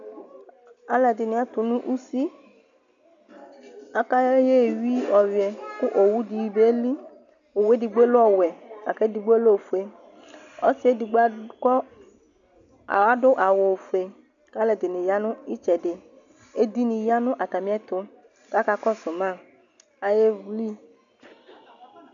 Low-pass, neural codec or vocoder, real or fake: 7.2 kHz; none; real